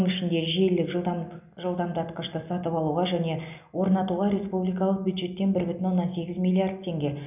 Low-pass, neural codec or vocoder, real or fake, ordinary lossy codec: 3.6 kHz; none; real; none